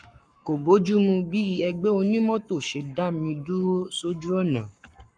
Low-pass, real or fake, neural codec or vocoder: 9.9 kHz; fake; codec, 44.1 kHz, 7.8 kbps, Pupu-Codec